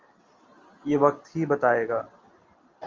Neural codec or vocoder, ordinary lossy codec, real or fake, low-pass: none; Opus, 32 kbps; real; 7.2 kHz